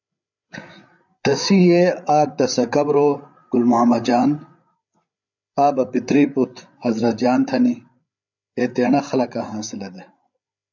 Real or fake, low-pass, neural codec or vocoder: fake; 7.2 kHz; codec, 16 kHz, 8 kbps, FreqCodec, larger model